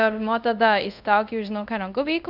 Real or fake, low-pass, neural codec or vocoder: fake; 5.4 kHz; codec, 24 kHz, 0.5 kbps, DualCodec